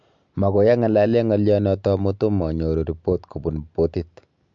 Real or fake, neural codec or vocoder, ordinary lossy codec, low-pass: real; none; MP3, 64 kbps; 7.2 kHz